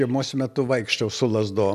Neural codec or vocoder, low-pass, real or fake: none; 14.4 kHz; real